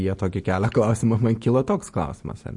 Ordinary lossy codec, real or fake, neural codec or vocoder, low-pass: MP3, 48 kbps; real; none; 10.8 kHz